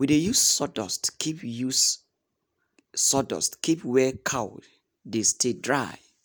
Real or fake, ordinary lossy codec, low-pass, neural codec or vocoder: real; none; none; none